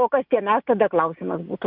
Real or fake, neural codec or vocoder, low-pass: real; none; 5.4 kHz